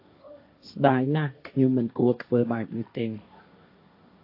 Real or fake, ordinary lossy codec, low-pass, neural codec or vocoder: fake; AAC, 32 kbps; 5.4 kHz; codec, 16 kHz, 1.1 kbps, Voila-Tokenizer